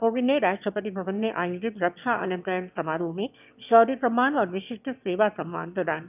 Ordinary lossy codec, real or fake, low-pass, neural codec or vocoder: none; fake; 3.6 kHz; autoencoder, 22.05 kHz, a latent of 192 numbers a frame, VITS, trained on one speaker